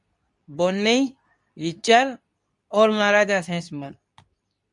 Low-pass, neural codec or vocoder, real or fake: 10.8 kHz; codec, 24 kHz, 0.9 kbps, WavTokenizer, medium speech release version 2; fake